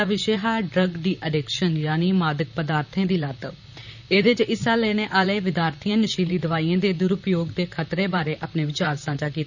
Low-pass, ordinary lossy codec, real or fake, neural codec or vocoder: 7.2 kHz; none; fake; vocoder, 44.1 kHz, 128 mel bands, Pupu-Vocoder